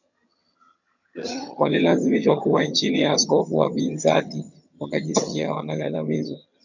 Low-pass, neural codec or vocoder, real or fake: 7.2 kHz; vocoder, 22.05 kHz, 80 mel bands, HiFi-GAN; fake